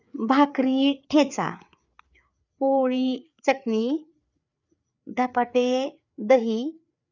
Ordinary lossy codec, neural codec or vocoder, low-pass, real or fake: none; codec, 16 kHz, 4 kbps, FreqCodec, larger model; 7.2 kHz; fake